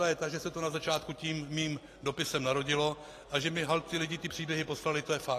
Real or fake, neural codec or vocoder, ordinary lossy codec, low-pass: real; none; AAC, 48 kbps; 14.4 kHz